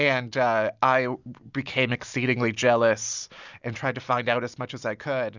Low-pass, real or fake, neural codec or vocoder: 7.2 kHz; real; none